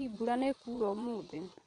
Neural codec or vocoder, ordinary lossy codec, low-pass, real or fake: vocoder, 22.05 kHz, 80 mel bands, Vocos; none; 9.9 kHz; fake